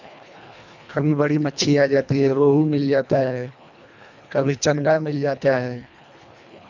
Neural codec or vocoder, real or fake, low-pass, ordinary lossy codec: codec, 24 kHz, 1.5 kbps, HILCodec; fake; 7.2 kHz; none